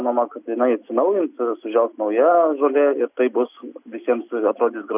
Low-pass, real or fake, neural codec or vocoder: 3.6 kHz; real; none